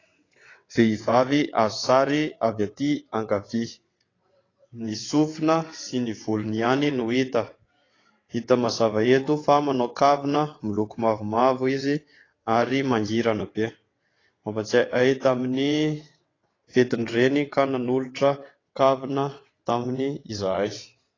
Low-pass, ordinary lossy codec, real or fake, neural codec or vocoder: 7.2 kHz; AAC, 32 kbps; fake; vocoder, 22.05 kHz, 80 mel bands, WaveNeXt